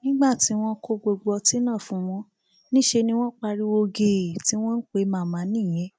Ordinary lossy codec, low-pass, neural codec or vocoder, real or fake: none; none; none; real